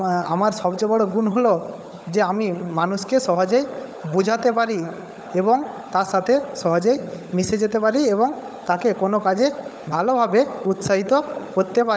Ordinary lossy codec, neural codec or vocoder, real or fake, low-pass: none; codec, 16 kHz, 16 kbps, FunCodec, trained on LibriTTS, 50 frames a second; fake; none